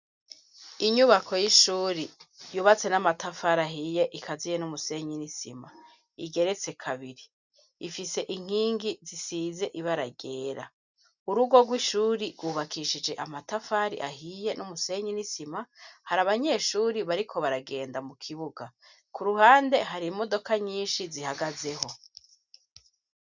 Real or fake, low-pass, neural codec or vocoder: real; 7.2 kHz; none